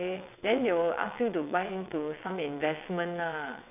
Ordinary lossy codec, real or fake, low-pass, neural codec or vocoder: none; fake; 3.6 kHz; vocoder, 22.05 kHz, 80 mel bands, WaveNeXt